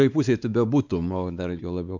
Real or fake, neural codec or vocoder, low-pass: fake; codec, 16 kHz, 4 kbps, X-Codec, WavLM features, trained on Multilingual LibriSpeech; 7.2 kHz